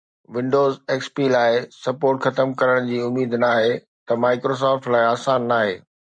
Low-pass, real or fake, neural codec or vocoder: 9.9 kHz; real; none